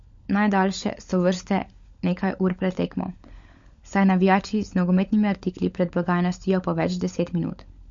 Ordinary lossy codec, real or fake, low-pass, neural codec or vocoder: MP3, 48 kbps; fake; 7.2 kHz; codec, 16 kHz, 16 kbps, FunCodec, trained on LibriTTS, 50 frames a second